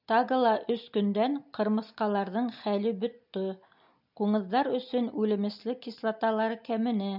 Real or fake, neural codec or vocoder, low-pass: real; none; 5.4 kHz